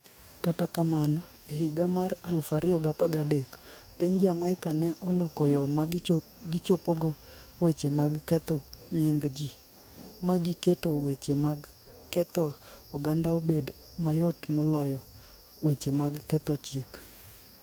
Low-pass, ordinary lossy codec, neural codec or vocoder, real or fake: none; none; codec, 44.1 kHz, 2.6 kbps, DAC; fake